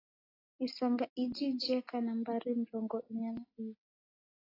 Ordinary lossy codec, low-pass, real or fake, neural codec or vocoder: AAC, 24 kbps; 5.4 kHz; real; none